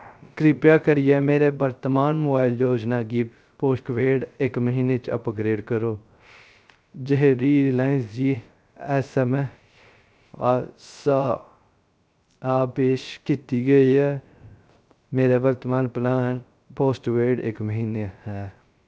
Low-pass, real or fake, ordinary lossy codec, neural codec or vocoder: none; fake; none; codec, 16 kHz, 0.3 kbps, FocalCodec